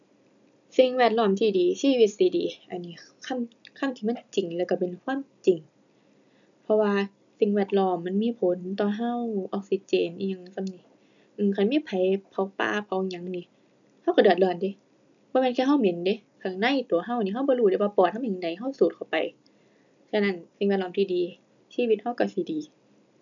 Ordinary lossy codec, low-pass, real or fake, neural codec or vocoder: none; 7.2 kHz; real; none